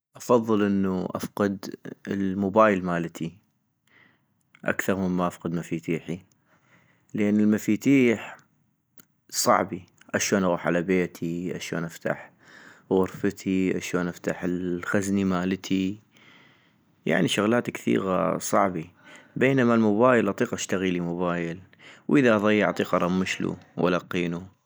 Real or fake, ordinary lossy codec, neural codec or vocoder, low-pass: real; none; none; none